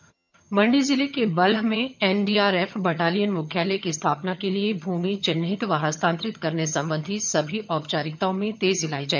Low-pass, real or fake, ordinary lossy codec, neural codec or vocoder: 7.2 kHz; fake; none; vocoder, 22.05 kHz, 80 mel bands, HiFi-GAN